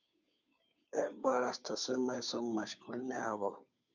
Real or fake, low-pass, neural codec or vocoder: fake; 7.2 kHz; codec, 16 kHz, 2 kbps, FunCodec, trained on Chinese and English, 25 frames a second